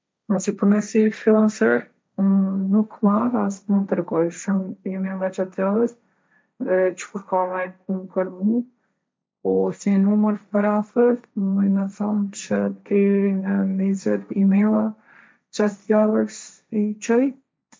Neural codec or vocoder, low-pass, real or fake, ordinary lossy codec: codec, 16 kHz, 1.1 kbps, Voila-Tokenizer; none; fake; none